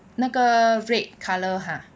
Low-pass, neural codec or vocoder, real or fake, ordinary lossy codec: none; none; real; none